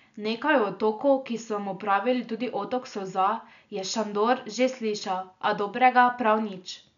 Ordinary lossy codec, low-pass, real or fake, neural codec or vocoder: none; 7.2 kHz; real; none